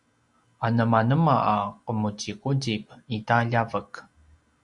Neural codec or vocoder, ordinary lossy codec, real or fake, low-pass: none; Opus, 64 kbps; real; 10.8 kHz